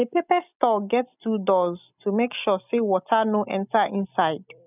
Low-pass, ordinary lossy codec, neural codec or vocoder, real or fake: 3.6 kHz; none; none; real